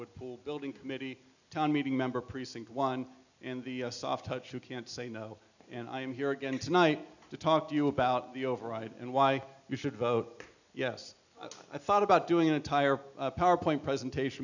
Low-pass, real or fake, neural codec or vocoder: 7.2 kHz; real; none